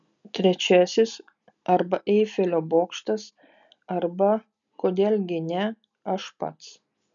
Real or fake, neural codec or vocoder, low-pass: real; none; 7.2 kHz